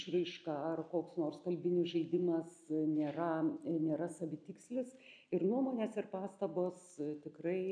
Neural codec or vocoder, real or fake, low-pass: none; real; 9.9 kHz